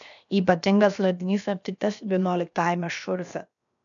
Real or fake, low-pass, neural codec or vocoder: fake; 7.2 kHz; codec, 16 kHz, 0.7 kbps, FocalCodec